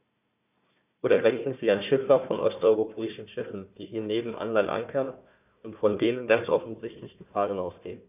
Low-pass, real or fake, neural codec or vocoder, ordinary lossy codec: 3.6 kHz; fake; codec, 16 kHz, 1 kbps, FunCodec, trained on Chinese and English, 50 frames a second; none